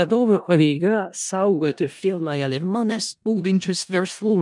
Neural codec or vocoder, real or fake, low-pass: codec, 16 kHz in and 24 kHz out, 0.4 kbps, LongCat-Audio-Codec, four codebook decoder; fake; 10.8 kHz